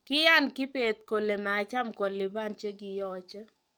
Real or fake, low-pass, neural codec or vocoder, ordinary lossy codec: fake; none; codec, 44.1 kHz, 7.8 kbps, DAC; none